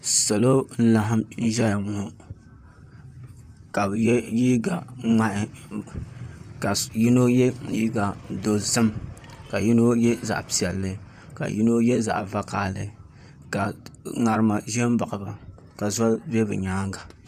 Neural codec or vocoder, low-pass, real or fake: vocoder, 44.1 kHz, 128 mel bands, Pupu-Vocoder; 14.4 kHz; fake